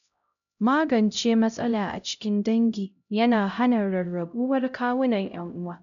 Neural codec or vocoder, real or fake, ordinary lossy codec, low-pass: codec, 16 kHz, 0.5 kbps, X-Codec, HuBERT features, trained on LibriSpeech; fake; none; 7.2 kHz